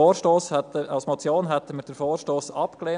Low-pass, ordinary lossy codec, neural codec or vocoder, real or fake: 9.9 kHz; none; none; real